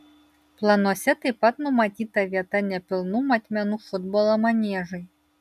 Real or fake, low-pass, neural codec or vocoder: real; 14.4 kHz; none